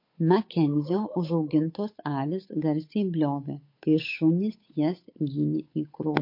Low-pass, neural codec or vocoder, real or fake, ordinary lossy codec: 5.4 kHz; codec, 16 kHz, 8 kbps, FunCodec, trained on Chinese and English, 25 frames a second; fake; MP3, 32 kbps